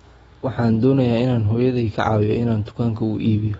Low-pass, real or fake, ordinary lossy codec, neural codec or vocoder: 19.8 kHz; fake; AAC, 24 kbps; autoencoder, 48 kHz, 128 numbers a frame, DAC-VAE, trained on Japanese speech